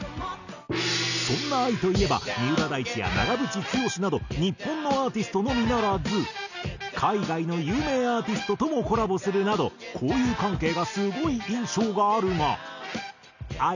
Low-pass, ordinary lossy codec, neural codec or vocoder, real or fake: 7.2 kHz; none; none; real